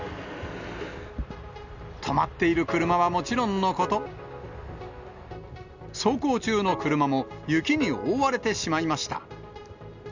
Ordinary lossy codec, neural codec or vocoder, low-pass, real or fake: none; none; 7.2 kHz; real